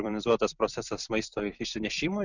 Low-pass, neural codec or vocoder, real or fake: 7.2 kHz; vocoder, 44.1 kHz, 128 mel bands every 256 samples, BigVGAN v2; fake